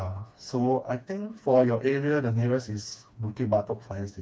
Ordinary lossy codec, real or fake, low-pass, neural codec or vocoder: none; fake; none; codec, 16 kHz, 2 kbps, FreqCodec, smaller model